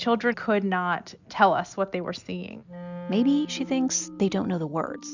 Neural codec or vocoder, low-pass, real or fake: none; 7.2 kHz; real